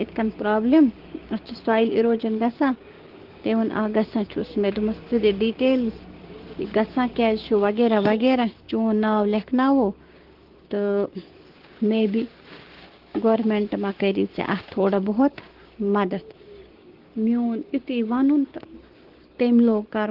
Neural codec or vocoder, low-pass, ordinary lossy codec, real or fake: codec, 16 kHz, 6 kbps, DAC; 5.4 kHz; Opus, 16 kbps; fake